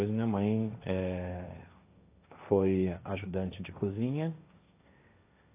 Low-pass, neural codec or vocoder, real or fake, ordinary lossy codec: 3.6 kHz; codec, 16 kHz, 1.1 kbps, Voila-Tokenizer; fake; AAC, 24 kbps